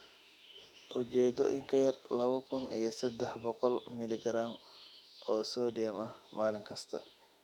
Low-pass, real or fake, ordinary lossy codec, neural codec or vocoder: 19.8 kHz; fake; none; autoencoder, 48 kHz, 32 numbers a frame, DAC-VAE, trained on Japanese speech